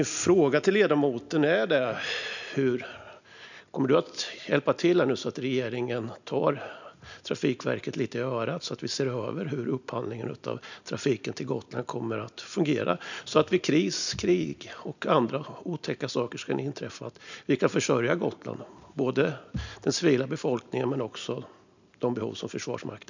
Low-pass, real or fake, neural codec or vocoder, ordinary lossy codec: 7.2 kHz; real; none; none